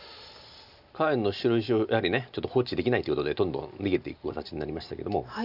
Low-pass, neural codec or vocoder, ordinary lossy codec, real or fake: 5.4 kHz; none; none; real